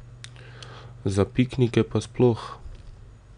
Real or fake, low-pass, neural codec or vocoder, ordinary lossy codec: real; 9.9 kHz; none; none